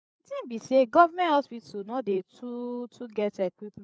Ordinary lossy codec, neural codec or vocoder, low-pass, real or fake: none; codec, 16 kHz, 8 kbps, FreqCodec, larger model; none; fake